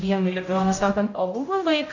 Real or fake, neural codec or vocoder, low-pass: fake; codec, 16 kHz, 0.5 kbps, X-Codec, HuBERT features, trained on general audio; 7.2 kHz